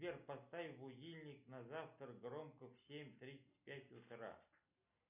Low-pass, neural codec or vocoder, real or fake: 3.6 kHz; none; real